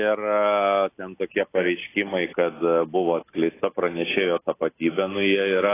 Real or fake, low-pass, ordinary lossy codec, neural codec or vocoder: real; 3.6 kHz; AAC, 16 kbps; none